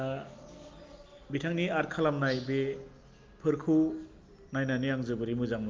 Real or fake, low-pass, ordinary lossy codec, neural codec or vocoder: real; 7.2 kHz; Opus, 32 kbps; none